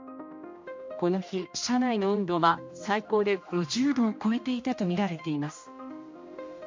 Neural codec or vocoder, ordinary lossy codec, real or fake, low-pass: codec, 16 kHz, 1 kbps, X-Codec, HuBERT features, trained on general audio; MP3, 48 kbps; fake; 7.2 kHz